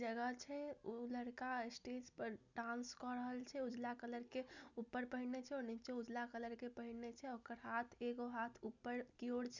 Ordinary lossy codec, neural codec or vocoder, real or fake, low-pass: none; none; real; 7.2 kHz